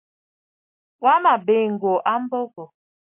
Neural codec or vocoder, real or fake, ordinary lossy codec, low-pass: none; real; MP3, 32 kbps; 3.6 kHz